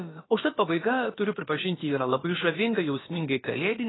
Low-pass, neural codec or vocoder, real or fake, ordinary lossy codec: 7.2 kHz; codec, 16 kHz, about 1 kbps, DyCAST, with the encoder's durations; fake; AAC, 16 kbps